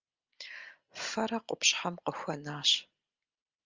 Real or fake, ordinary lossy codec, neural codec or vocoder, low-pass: real; Opus, 32 kbps; none; 7.2 kHz